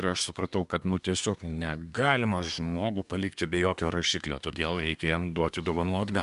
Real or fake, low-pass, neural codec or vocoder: fake; 10.8 kHz; codec, 24 kHz, 1 kbps, SNAC